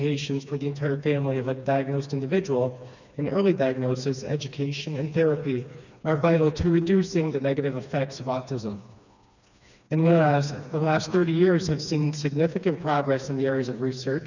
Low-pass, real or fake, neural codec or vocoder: 7.2 kHz; fake; codec, 16 kHz, 2 kbps, FreqCodec, smaller model